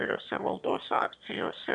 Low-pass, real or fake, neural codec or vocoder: 9.9 kHz; fake; autoencoder, 22.05 kHz, a latent of 192 numbers a frame, VITS, trained on one speaker